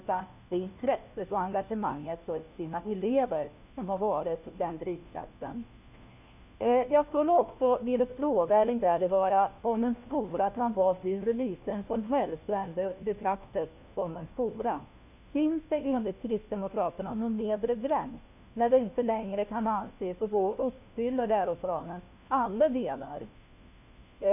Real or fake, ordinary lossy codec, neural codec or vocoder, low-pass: fake; none; codec, 16 kHz, 1 kbps, FunCodec, trained on LibriTTS, 50 frames a second; 3.6 kHz